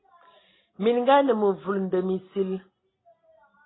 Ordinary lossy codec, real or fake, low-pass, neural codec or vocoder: AAC, 16 kbps; real; 7.2 kHz; none